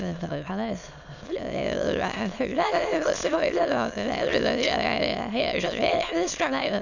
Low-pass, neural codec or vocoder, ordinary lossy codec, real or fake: 7.2 kHz; autoencoder, 22.05 kHz, a latent of 192 numbers a frame, VITS, trained on many speakers; none; fake